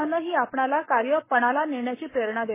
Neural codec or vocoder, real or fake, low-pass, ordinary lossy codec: codec, 44.1 kHz, 7.8 kbps, Pupu-Codec; fake; 3.6 kHz; MP3, 16 kbps